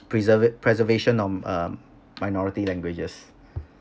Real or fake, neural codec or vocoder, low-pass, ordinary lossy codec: real; none; none; none